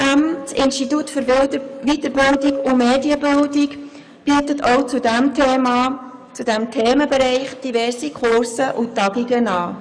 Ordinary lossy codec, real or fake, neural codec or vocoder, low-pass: none; fake; codec, 44.1 kHz, 7.8 kbps, DAC; 9.9 kHz